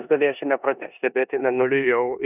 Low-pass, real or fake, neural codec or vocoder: 3.6 kHz; fake; codec, 16 kHz in and 24 kHz out, 0.9 kbps, LongCat-Audio-Codec, four codebook decoder